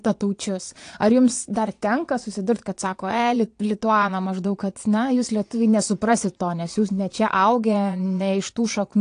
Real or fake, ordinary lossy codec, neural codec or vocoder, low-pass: fake; AAC, 48 kbps; vocoder, 22.05 kHz, 80 mel bands, Vocos; 9.9 kHz